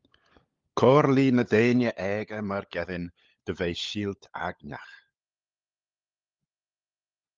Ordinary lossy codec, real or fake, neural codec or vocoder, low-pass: Opus, 32 kbps; fake; codec, 16 kHz, 16 kbps, FunCodec, trained on LibriTTS, 50 frames a second; 7.2 kHz